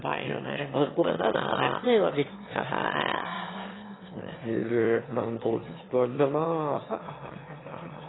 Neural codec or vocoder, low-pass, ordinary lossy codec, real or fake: autoencoder, 22.05 kHz, a latent of 192 numbers a frame, VITS, trained on one speaker; 7.2 kHz; AAC, 16 kbps; fake